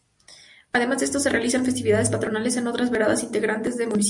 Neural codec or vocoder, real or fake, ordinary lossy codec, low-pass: none; real; MP3, 64 kbps; 10.8 kHz